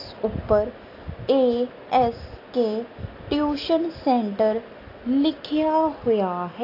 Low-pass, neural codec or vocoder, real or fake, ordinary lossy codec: 5.4 kHz; none; real; AAC, 32 kbps